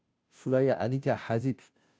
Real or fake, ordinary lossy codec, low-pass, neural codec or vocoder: fake; none; none; codec, 16 kHz, 0.5 kbps, FunCodec, trained on Chinese and English, 25 frames a second